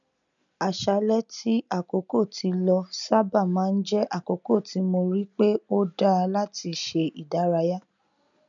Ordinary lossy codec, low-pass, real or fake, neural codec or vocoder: none; 7.2 kHz; real; none